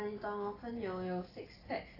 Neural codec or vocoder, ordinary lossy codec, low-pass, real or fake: none; AAC, 24 kbps; 5.4 kHz; real